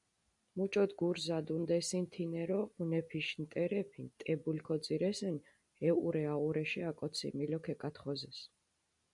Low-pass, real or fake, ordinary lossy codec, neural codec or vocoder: 10.8 kHz; real; MP3, 96 kbps; none